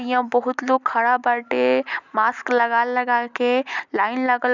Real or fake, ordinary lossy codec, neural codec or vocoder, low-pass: real; none; none; 7.2 kHz